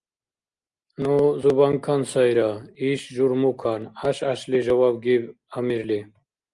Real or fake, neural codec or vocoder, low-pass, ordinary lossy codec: real; none; 10.8 kHz; Opus, 32 kbps